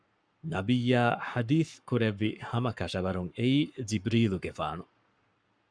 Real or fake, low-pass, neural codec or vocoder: fake; 9.9 kHz; codec, 44.1 kHz, 7.8 kbps, Pupu-Codec